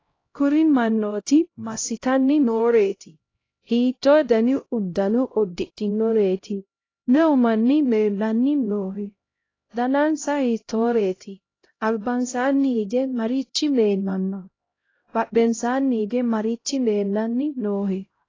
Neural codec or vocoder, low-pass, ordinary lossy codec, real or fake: codec, 16 kHz, 0.5 kbps, X-Codec, HuBERT features, trained on LibriSpeech; 7.2 kHz; AAC, 32 kbps; fake